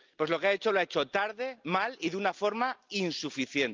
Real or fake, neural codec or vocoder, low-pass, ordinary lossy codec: real; none; 7.2 kHz; Opus, 24 kbps